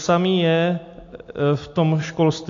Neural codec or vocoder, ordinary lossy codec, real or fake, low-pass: none; AAC, 48 kbps; real; 7.2 kHz